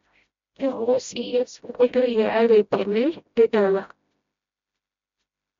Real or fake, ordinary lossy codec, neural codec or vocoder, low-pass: fake; MP3, 48 kbps; codec, 16 kHz, 0.5 kbps, FreqCodec, smaller model; 7.2 kHz